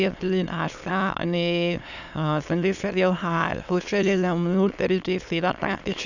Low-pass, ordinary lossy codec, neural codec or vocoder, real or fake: 7.2 kHz; none; autoencoder, 22.05 kHz, a latent of 192 numbers a frame, VITS, trained on many speakers; fake